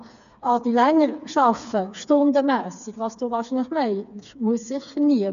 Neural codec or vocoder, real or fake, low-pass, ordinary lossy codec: codec, 16 kHz, 4 kbps, FreqCodec, smaller model; fake; 7.2 kHz; none